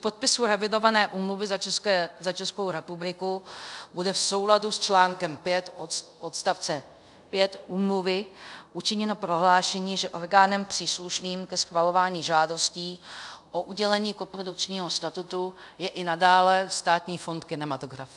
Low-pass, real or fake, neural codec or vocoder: 10.8 kHz; fake; codec, 24 kHz, 0.5 kbps, DualCodec